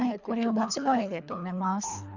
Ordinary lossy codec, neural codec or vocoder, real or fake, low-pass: none; codec, 24 kHz, 3 kbps, HILCodec; fake; 7.2 kHz